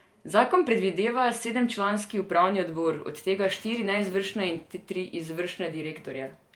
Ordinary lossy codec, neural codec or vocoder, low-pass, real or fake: Opus, 32 kbps; vocoder, 48 kHz, 128 mel bands, Vocos; 19.8 kHz; fake